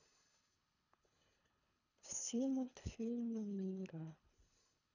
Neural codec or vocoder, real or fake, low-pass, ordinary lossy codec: codec, 24 kHz, 3 kbps, HILCodec; fake; 7.2 kHz; none